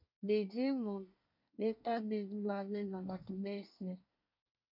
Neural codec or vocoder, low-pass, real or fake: codec, 24 kHz, 1 kbps, SNAC; 5.4 kHz; fake